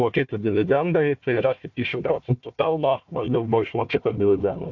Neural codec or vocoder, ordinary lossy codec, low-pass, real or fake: codec, 16 kHz, 1 kbps, FunCodec, trained on Chinese and English, 50 frames a second; AAC, 48 kbps; 7.2 kHz; fake